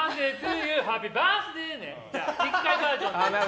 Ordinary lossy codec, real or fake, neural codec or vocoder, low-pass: none; real; none; none